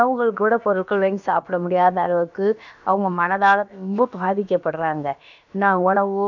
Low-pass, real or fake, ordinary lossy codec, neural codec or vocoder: 7.2 kHz; fake; none; codec, 16 kHz, about 1 kbps, DyCAST, with the encoder's durations